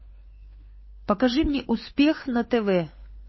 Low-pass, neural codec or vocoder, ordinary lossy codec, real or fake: 7.2 kHz; codec, 16 kHz, 4 kbps, FunCodec, trained on LibriTTS, 50 frames a second; MP3, 24 kbps; fake